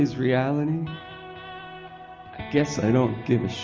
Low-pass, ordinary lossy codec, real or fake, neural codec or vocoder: 7.2 kHz; Opus, 24 kbps; real; none